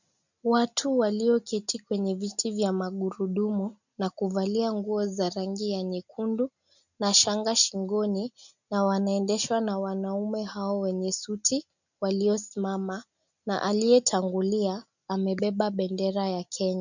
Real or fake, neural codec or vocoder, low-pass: real; none; 7.2 kHz